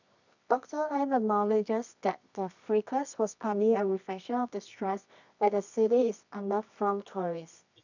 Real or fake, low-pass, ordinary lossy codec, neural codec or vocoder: fake; 7.2 kHz; none; codec, 24 kHz, 0.9 kbps, WavTokenizer, medium music audio release